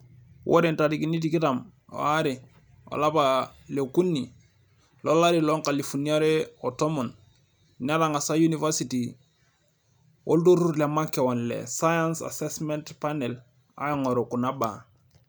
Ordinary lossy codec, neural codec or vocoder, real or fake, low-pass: none; none; real; none